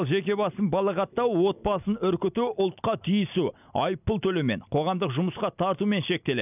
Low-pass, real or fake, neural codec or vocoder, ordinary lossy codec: 3.6 kHz; real; none; none